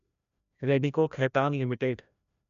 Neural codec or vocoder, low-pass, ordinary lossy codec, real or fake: codec, 16 kHz, 1 kbps, FreqCodec, larger model; 7.2 kHz; none; fake